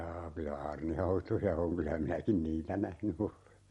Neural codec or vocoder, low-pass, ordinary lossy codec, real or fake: none; 19.8 kHz; MP3, 48 kbps; real